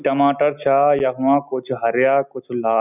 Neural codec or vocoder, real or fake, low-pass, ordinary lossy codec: none; real; 3.6 kHz; none